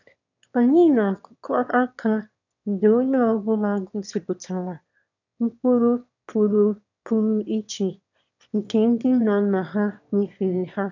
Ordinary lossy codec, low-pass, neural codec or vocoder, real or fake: none; 7.2 kHz; autoencoder, 22.05 kHz, a latent of 192 numbers a frame, VITS, trained on one speaker; fake